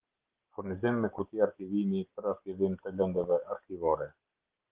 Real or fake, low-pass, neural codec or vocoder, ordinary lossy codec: real; 3.6 kHz; none; Opus, 16 kbps